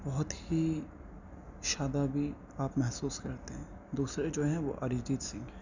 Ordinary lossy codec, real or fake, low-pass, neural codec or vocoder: none; real; 7.2 kHz; none